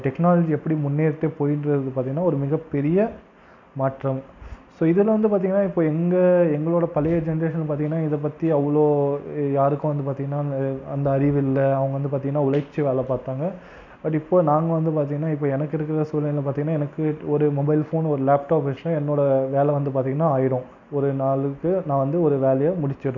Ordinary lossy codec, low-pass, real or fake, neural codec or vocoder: none; 7.2 kHz; real; none